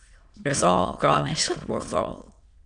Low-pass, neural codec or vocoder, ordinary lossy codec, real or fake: 9.9 kHz; autoencoder, 22.05 kHz, a latent of 192 numbers a frame, VITS, trained on many speakers; Opus, 64 kbps; fake